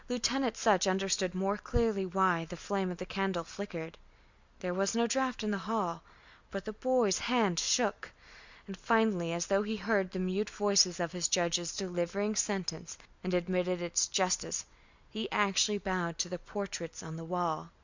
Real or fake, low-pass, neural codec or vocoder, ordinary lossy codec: real; 7.2 kHz; none; Opus, 64 kbps